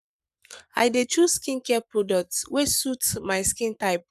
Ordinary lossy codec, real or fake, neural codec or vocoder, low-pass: none; fake; codec, 44.1 kHz, 7.8 kbps, Pupu-Codec; 14.4 kHz